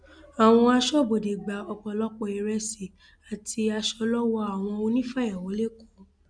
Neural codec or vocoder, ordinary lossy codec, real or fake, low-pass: none; none; real; 9.9 kHz